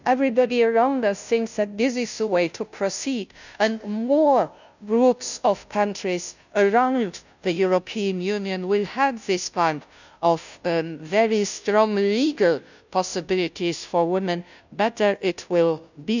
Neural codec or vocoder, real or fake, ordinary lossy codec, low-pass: codec, 16 kHz, 0.5 kbps, FunCodec, trained on Chinese and English, 25 frames a second; fake; none; 7.2 kHz